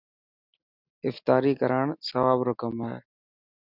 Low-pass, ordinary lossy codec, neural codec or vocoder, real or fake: 5.4 kHz; Opus, 64 kbps; none; real